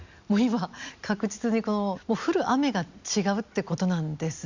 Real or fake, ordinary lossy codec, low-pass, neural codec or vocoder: real; Opus, 64 kbps; 7.2 kHz; none